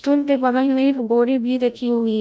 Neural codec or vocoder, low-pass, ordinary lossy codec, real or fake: codec, 16 kHz, 0.5 kbps, FreqCodec, larger model; none; none; fake